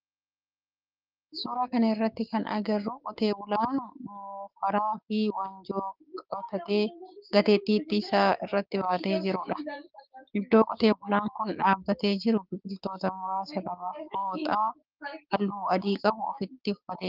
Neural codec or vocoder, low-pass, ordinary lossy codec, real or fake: codec, 16 kHz, 6 kbps, DAC; 5.4 kHz; Opus, 32 kbps; fake